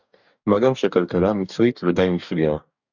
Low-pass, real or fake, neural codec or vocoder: 7.2 kHz; fake; codec, 44.1 kHz, 2.6 kbps, DAC